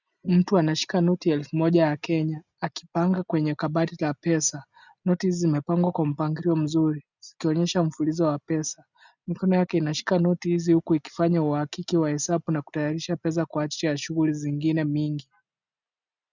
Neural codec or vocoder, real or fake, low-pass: none; real; 7.2 kHz